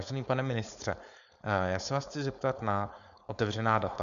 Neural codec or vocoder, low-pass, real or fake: codec, 16 kHz, 4.8 kbps, FACodec; 7.2 kHz; fake